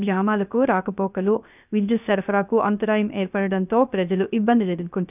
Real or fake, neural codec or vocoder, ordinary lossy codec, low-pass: fake; codec, 16 kHz, 0.3 kbps, FocalCodec; none; 3.6 kHz